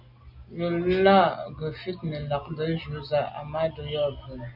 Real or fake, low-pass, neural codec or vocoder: real; 5.4 kHz; none